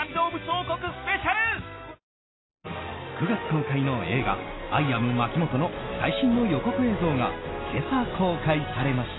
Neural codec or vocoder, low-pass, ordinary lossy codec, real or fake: none; 7.2 kHz; AAC, 16 kbps; real